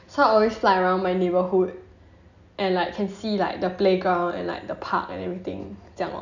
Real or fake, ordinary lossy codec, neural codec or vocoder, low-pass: real; none; none; 7.2 kHz